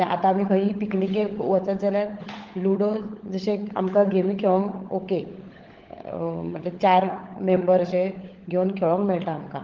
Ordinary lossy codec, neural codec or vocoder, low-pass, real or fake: Opus, 16 kbps; codec, 16 kHz, 16 kbps, FunCodec, trained on LibriTTS, 50 frames a second; 7.2 kHz; fake